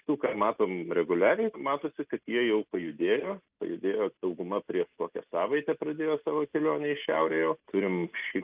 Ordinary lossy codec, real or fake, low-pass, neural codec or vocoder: Opus, 24 kbps; real; 3.6 kHz; none